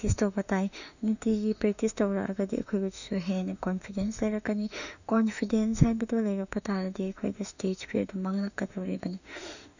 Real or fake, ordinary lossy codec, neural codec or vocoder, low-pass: fake; none; autoencoder, 48 kHz, 32 numbers a frame, DAC-VAE, trained on Japanese speech; 7.2 kHz